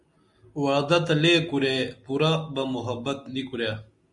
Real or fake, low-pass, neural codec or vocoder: real; 10.8 kHz; none